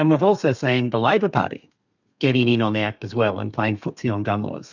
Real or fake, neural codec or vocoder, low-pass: fake; codec, 32 kHz, 1.9 kbps, SNAC; 7.2 kHz